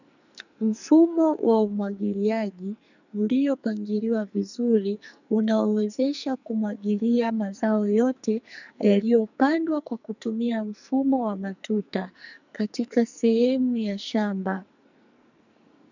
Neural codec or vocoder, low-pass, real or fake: codec, 44.1 kHz, 2.6 kbps, SNAC; 7.2 kHz; fake